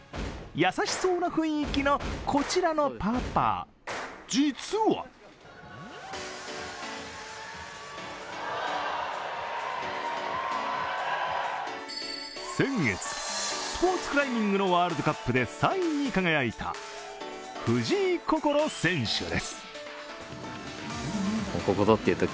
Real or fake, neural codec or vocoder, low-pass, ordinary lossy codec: real; none; none; none